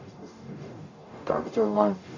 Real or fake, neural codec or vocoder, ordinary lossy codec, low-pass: fake; codec, 44.1 kHz, 0.9 kbps, DAC; AAC, 48 kbps; 7.2 kHz